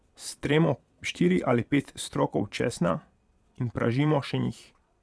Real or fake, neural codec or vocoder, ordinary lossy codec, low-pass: fake; vocoder, 22.05 kHz, 80 mel bands, Vocos; none; none